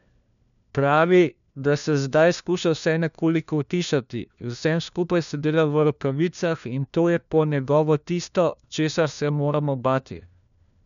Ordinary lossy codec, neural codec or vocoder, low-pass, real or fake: none; codec, 16 kHz, 1 kbps, FunCodec, trained on LibriTTS, 50 frames a second; 7.2 kHz; fake